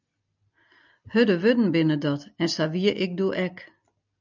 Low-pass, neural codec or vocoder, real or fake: 7.2 kHz; none; real